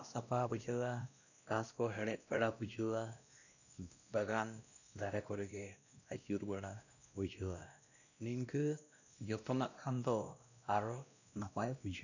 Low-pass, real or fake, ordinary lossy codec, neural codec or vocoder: 7.2 kHz; fake; none; codec, 16 kHz, 1 kbps, X-Codec, WavLM features, trained on Multilingual LibriSpeech